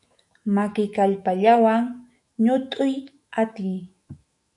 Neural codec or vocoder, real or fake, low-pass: autoencoder, 48 kHz, 128 numbers a frame, DAC-VAE, trained on Japanese speech; fake; 10.8 kHz